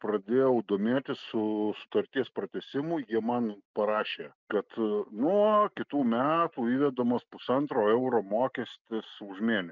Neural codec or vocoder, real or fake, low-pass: none; real; 7.2 kHz